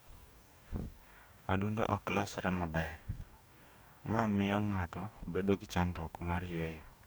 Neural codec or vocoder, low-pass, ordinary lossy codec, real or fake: codec, 44.1 kHz, 2.6 kbps, DAC; none; none; fake